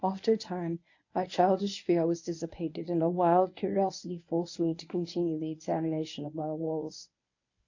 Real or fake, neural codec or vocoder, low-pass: fake; codec, 24 kHz, 0.9 kbps, WavTokenizer, medium speech release version 1; 7.2 kHz